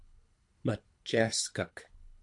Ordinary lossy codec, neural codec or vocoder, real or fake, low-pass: MP3, 64 kbps; codec, 24 kHz, 3 kbps, HILCodec; fake; 10.8 kHz